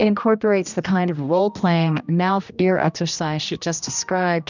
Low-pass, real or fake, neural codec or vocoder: 7.2 kHz; fake; codec, 16 kHz, 1 kbps, X-Codec, HuBERT features, trained on general audio